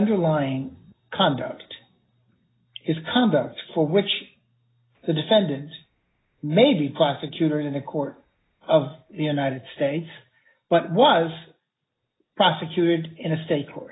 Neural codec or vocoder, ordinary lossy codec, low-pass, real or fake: none; AAC, 16 kbps; 7.2 kHz; real